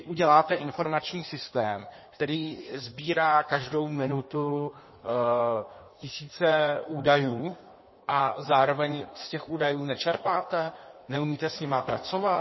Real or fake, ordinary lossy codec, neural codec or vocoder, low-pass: fake; MP3, 24 kbps; codec, 16 kHz in and 24 kHz out, 1.1 kbps, FireRedTTS-2 codec; 7.2 kHz